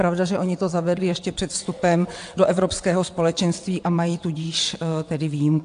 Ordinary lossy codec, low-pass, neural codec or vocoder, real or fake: AAC, 64 kbps; 9.9 kHz; vocoder, 22.05 kHz, 80 mel bands, WaveNeXt; fake